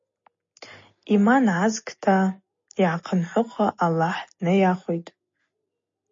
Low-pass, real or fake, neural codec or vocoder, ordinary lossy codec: 7.2 kHz; real; none; MP3, 32 kbps